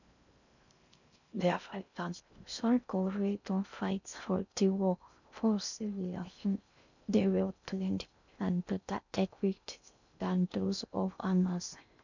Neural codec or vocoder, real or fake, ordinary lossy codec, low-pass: codec, 16 kHz in and 24 kHz out, 0.6 kbps, FocalCodec, streaming, 4096 codes; fake; none; 7.2 kHz